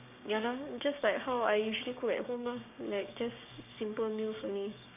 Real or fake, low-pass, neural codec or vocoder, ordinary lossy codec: fake; 3.6 kHz; codec, 16 kHz, 6 kbps, DAC; none